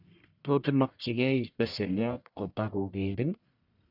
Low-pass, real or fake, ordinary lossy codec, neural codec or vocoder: 5.4 kHz; fake; none; codec, 44.1 kHz, 1.7 kbps, Pupu-Codec